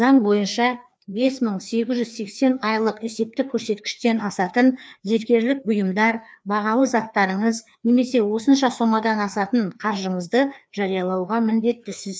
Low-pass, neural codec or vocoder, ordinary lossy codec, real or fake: none; codec, 16 kHz, 2 kbps, FreqCodec, larger model; none; fake